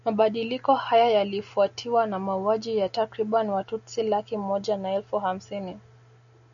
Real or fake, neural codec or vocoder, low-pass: real; none; 7.2 kHz